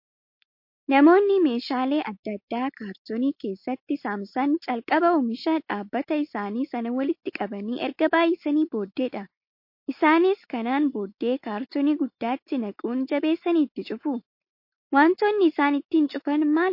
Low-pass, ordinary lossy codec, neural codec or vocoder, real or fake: 5.4 kHz; MP3, 32 kbps; none; real